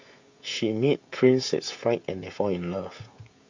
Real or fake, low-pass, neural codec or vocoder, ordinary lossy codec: fake; 7.2 kHz; codec, 16 kHz, 16 kbps, FreqCodec, smaller model; MP3, 48 kbps